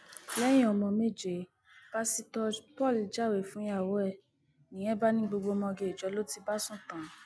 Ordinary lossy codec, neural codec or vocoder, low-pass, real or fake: none; none; none; real